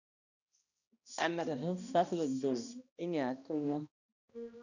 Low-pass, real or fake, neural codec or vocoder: 7.2 kHz; fake; codec, 16 kHz, 1 kbps, X-Codec, HuBERT features, trained on balanced general audio